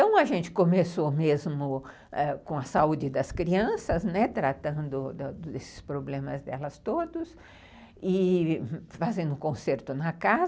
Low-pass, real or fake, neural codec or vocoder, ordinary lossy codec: none; real; none; none